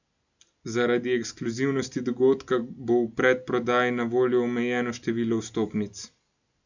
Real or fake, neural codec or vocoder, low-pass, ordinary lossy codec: real; none; 7.2 kHz; none